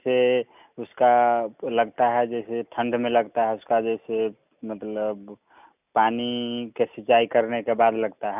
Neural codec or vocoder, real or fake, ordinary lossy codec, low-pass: none; real; none; 3.6 kHz